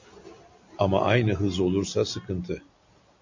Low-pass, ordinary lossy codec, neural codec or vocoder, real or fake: 7.2 kHz; AAC, 48 kbps; none; real